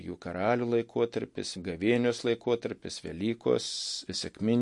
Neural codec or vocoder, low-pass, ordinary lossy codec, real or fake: none; 10.8 kHz; MP3, 48 kbps; real